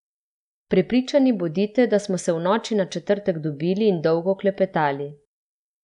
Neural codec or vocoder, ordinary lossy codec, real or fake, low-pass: none; none; real; 10.8 kHz